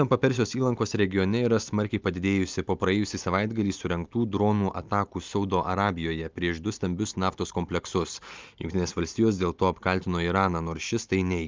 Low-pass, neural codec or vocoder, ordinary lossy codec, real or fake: 7.2 kHz; none; Opus, 24 kbps; real